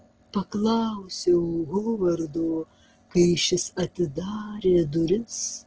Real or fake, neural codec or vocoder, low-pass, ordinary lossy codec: real; none; 7.2 kHz; Opus, 16 kbps